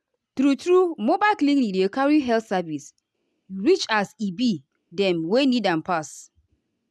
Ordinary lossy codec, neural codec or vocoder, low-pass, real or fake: none; none; none; real